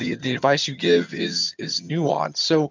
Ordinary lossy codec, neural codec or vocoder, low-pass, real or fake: MP3, 64 kbps; vocoder, 22.05 kHz, 80 mel bands, HiFi-GAN; 7.2 kHz; fake